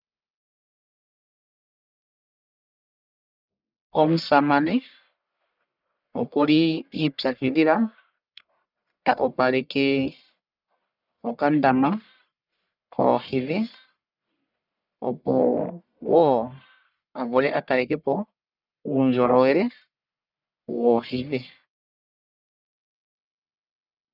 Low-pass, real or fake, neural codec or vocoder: 5.4 kHz; fake; codec, 44.1 kHz, 1.7 kbps, Pupu-Codec